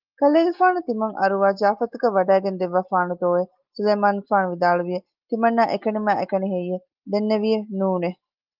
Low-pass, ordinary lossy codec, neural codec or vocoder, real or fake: 5.4 kHz; Opus, 32 kbps; none; real